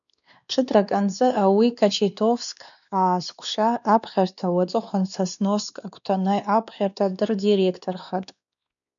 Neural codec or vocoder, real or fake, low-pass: codec, 16 kHz, 2 kbps, X-Codec, WavLM features, trained on Multilingual LibriSpeech; fake; 7.2 kHz